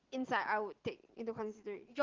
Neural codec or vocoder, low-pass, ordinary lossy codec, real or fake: none; 7.2 kHz; Opus, 16 kbps; real